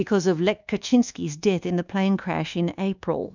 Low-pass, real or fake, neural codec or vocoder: 7.2 kHz; fake; codec, 24 kHz, 1.2 kbps, DualCodec